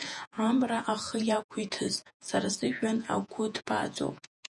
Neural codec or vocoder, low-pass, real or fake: vocoder, 48 kHz, 128 mel bands, Vocos; 10.8 kHz; fake